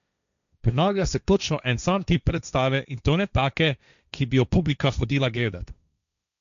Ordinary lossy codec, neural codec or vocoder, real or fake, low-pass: none; codec, 16 kHz, 1.1 kbps, Voila-Tokenizer; fake; 7.2 kHz